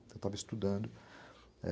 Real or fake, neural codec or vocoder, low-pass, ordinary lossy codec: real; none; none; none